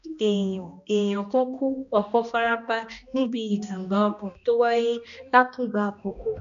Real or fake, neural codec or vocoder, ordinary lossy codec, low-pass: fake; codec, 16 kHz, 1 kbps, X-Codec, HuBERT features, trained on balanced general audio; none; 7.2 kHz